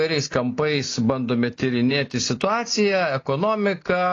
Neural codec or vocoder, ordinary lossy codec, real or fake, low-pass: none; AAC, 32 kbps; real; 7.2 kHz